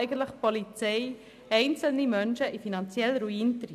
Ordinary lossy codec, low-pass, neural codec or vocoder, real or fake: MP3, 96 kbps; 14.4 kHz; none; real